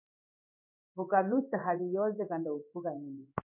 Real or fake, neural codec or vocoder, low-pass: fake; codec, 16 kHz in and 24 kHz out, 1 kbps, XY-Tokenizer; 3.6 kHz